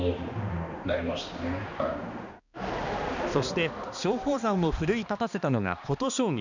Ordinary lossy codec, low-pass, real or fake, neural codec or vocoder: none; 7.2 kHz; fake; codec, 16 kHz, 2 kbps, X-Codec, HuBERT features, trained on balanced general audio